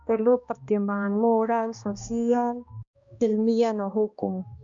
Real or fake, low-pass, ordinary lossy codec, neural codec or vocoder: fake; 7.2 kHz; Opus, 64 kbps; codec, 16 kHz, 1 kbps, X-Codec, HuBERT features, trained on balanced general audio